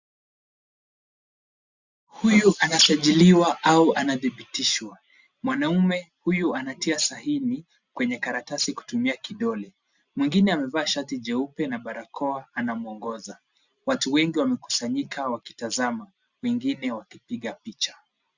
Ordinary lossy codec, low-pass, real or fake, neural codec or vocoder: Opus, 64 kbps; 7.2 kHz; real; none